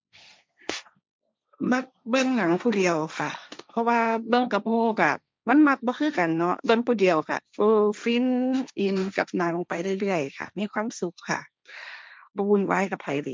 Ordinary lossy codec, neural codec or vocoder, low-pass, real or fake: none; codec, 16 kHz, 1.1 kbps, Voila-Tokenizer; none; fake